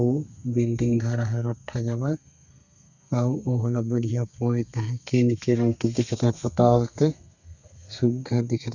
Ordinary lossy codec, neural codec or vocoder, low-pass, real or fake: none; codec, 32 kHz, 1.9 kbps, SNAC; 7.2 kHz; fake